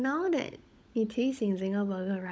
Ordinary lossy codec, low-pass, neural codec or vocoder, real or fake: none; none; codec, 16 kHz, 16 kbps, FunCodec, trained on LibriTTS, 50 frames a second; fake